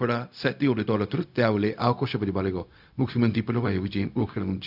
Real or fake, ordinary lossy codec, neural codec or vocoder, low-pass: fake; none; codec, 16 kHz, 0.4 kbps, LongCat-Audio-Codec; 5.4 kHz